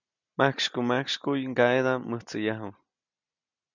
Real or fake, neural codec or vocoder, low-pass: real; none; 7.2 kHz